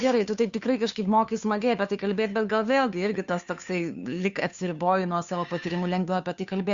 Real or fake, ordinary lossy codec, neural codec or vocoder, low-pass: fake; Opus, 64 kbps; codec, 16 kHz, 2 kbps, FunCodec, trained on Chinese and English, 25 frames a second; 7.2 kHz